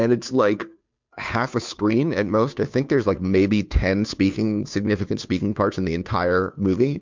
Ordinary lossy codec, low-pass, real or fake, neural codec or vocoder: MP3, 64 kbps; 7.2 kHz; fake; codec, 16 kHz, 2 kbps, FunCodec, trained on Chinese and English, 25 frames a second